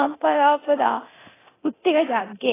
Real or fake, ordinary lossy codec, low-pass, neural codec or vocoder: fake; AAC, 16 kbps; 3.6 kHz; codec, 24 kHz, 0.9 kbps, DualCodec